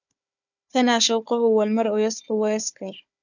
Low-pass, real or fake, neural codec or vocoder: 7.2 kHz; fake; codec, 16 kHz, 4 kbps, FunCodec, trained on Chinese and English, 50 frames a second